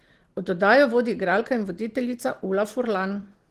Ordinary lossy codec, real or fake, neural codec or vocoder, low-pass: Opus, 16 kbps; real; none; 14.4 kHz